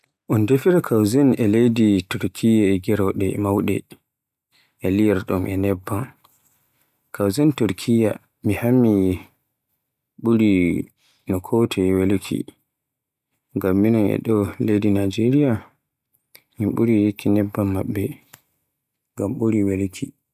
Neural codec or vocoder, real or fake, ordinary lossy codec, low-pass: none; real; none; 14.4 kHz